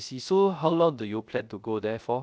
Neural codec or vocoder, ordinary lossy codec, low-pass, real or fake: codec, 16 kHz, 0.3 kbps, FocalCodec; none; none; fake